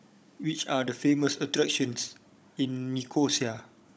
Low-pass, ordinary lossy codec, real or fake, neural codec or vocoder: none; none; fake; codec, 16 kHz, 16 kbps, FunCodec, trained on Chinese and English, 50 frames a second